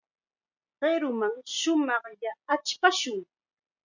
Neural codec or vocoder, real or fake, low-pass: none; real; 7.2 kHz